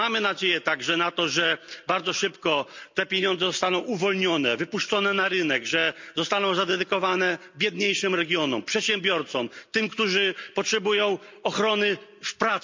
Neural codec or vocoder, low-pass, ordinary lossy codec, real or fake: none; 7.2 kHz; MP3, 64 kbps; real